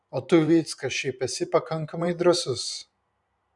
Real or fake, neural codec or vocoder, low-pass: fake; vocoder, 44.1 kHz, 128 mel bands every 512 samples, BigVGAN v2; 10.8 kHz